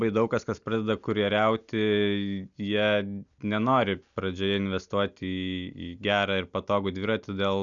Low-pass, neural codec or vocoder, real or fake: 7.2 kHz; none; real